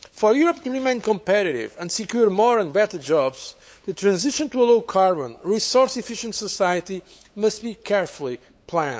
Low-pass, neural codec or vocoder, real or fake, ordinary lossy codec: none; codec, 16 kHz, 8 kbps, FunCodec, trained on LibriTTS, 25 frames a second; fake; none